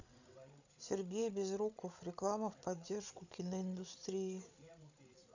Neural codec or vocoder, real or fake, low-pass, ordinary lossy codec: codec, 16 kHz, 16 kbps, FreqCodec, larger model; fake; 7.2 kHz; none